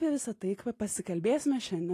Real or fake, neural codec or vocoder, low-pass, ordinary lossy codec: real; none; 14.4 kHz; AAC, 48 kbps